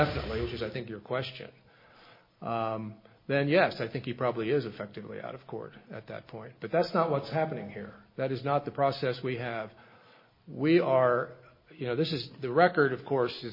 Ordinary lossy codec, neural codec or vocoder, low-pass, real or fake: MP3, 24 kbps; none; 5.4 kHz; real